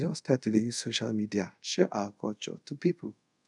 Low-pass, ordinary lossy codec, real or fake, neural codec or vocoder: 10.8 kHz; none; fake; codec, 24 kHz, 0.5 kbps, DualCodec